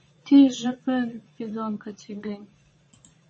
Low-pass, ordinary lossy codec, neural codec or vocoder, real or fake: 9.9 kHz; MP3, 32 kbps; vocoder, 22.05 kHz, 80 mel bands, Vocos; fake